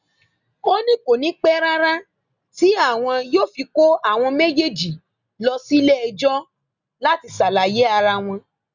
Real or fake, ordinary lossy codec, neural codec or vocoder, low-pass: real; Opus, 64 kbps; none; 7.2 kHz